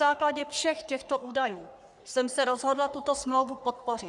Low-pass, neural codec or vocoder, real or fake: 10.8 kHz; codec, 44.1 kHz, 3.4 kbps, Pupu-Codec; fake